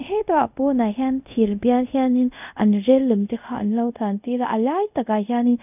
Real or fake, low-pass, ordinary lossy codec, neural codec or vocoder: fake; 3.6 kHz; none; codec, 24 kHz, 0.5 kbps, DualCodec